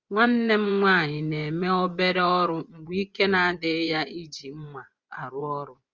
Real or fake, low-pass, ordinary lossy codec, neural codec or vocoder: fake; 7.2 kHz; Opus, 32 kbps; vocoder, 24 kHz, 100 mel bands, Vocos